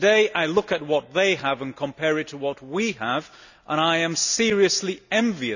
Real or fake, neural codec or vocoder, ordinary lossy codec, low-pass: real; none; none; 7.2 kHz